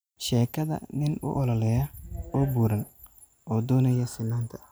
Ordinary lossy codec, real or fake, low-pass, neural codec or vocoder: none; real; none; none